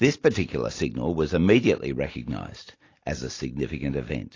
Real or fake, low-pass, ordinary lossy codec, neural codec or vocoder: real; 7.2 kHz; AAC, 32 kbps; none